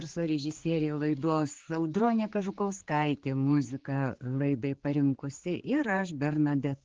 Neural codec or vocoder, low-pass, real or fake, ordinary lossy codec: codec, 16 kHz, 4 kbps, X-Codec, HuBERT features, trained on general audio; 7.2 kHz; fake; Opus, 16 kbps